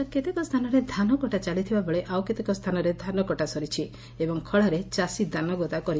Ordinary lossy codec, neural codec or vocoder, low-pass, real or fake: none; none; none; real